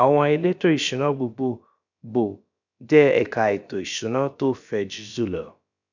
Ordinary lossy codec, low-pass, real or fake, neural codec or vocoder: none; 7.2 kHz; fake; codec, 16 kHz, about 1 kbps, DyCAST, with the encoder's durations